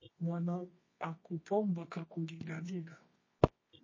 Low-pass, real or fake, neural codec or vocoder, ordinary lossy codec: 7.2 kHz; fake; codec, 24 kHz, 0.9 kbps, WavTokenizer, medium music audio release; MP3, 32 kbps